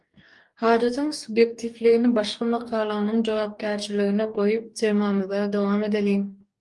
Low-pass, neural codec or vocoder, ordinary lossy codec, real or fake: 10.8 kHz; codec, 44.1 kHz, 2.6 kbps, DAC; Opus, 32 kbps; fake